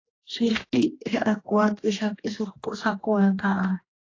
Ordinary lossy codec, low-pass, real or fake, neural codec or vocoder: AAC, 32 kbps; 7.2 kHz; fake; codec, 16 kHz, 1 kbps, X-Codec, HuBERT features, trained on general audio